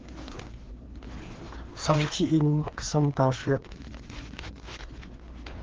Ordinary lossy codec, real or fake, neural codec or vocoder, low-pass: Opus, 16 kbps; fake; codec, 16 kHz, 2 kbps, FreqCodec, larger model; 7.2 kHz